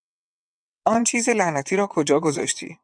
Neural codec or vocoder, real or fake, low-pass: vocoder, 22.05 kHz, 80 mel bands, WaveNeXt; fake; 9.9 kHz